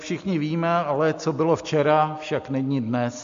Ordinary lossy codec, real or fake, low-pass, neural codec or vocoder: MP3, 48 kbps; real; 7.2 kHz; none